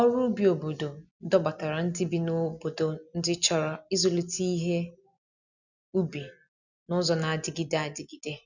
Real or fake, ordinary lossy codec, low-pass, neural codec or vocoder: real; none; 7.2 kHz; none